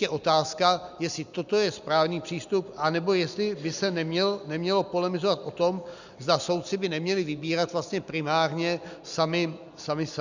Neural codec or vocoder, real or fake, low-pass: none; real; 7.2 kHz